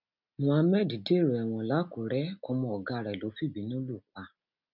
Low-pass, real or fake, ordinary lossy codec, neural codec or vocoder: 5.4 kHz; real; none; none